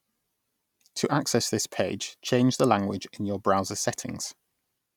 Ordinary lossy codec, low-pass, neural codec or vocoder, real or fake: none; 19.8 kHz; none; real